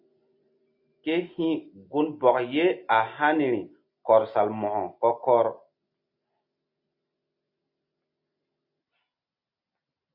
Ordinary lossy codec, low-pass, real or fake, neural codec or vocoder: MP3, 32 kbps; 5.4 kHz; real; none